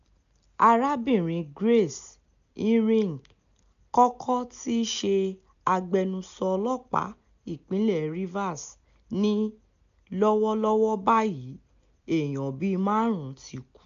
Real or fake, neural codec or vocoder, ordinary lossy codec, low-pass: real; none; none; 7.2 kHz